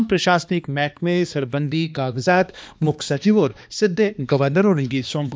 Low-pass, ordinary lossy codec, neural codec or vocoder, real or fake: none; none; codec, 16 kHz, 2 kbps, X-Codec, HuBERT features, trained on balanced general audio; fake